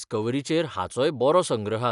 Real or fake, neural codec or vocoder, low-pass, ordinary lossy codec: real; none; 10.8 kHz; none